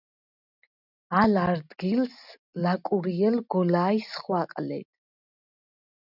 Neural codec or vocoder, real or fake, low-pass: none; real; 5.4 kHz